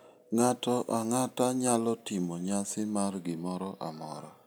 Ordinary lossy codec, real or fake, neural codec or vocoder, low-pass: none; real; none; none